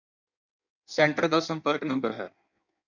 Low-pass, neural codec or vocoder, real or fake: 7.2 kHz; codec, 16 kHz in and 24 kHz out, 1.1 kbps, FireRedTTS-2 codec; fake